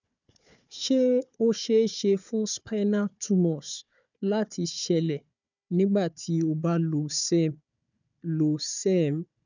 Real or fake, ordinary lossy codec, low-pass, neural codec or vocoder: fake; none; 7.2 kHz; codec, 16 kHz, 4 kbps, FunCodec, trained on Chinese and English, 50 frames a second